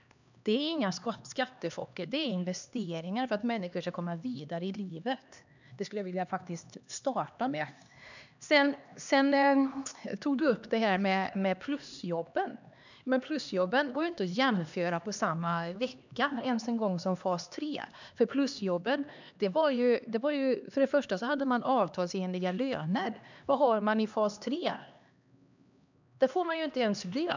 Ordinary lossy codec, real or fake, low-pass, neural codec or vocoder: none; fake; 7.2 kHz; codec, 16 kHz, 2 kbps, X-Codec, HuBERT features, trained on LibriSpeech